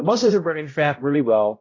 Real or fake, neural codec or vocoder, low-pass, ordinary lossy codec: fake; codec, 16 kHz, 0.5 kbps, X-Codec, HuBERT features, trained on balanced general audio; 7.2 kHz; AAC, 32 kbps